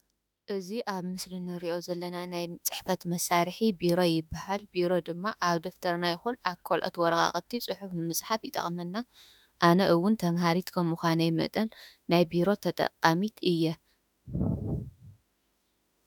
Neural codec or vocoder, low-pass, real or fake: autoencoder, 48 kHz, 32 numbers a frame, DAC-VAE, trained on Japanese speech; 19.8 kHz; fake